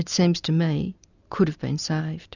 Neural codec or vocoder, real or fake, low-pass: none; real; 7.2 kHz